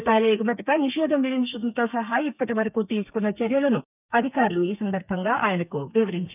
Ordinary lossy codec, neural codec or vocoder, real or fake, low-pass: none; codec, 32 kHz, 1.9 kbps, SNAC; fake; 3.6 kHz